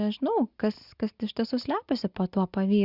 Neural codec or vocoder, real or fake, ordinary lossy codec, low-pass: none; real; Opus, 64 kbps; 5.4 kHz